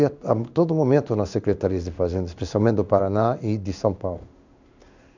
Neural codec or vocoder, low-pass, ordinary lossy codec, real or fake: codec, 16 kHz in and 24 kHz out, 1 kbps, XY-Tokenizer; 7.2 kHz; none; fake